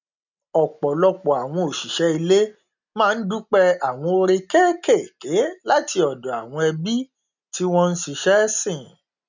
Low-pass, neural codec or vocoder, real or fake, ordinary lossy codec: 7.2 kHz; none; real; none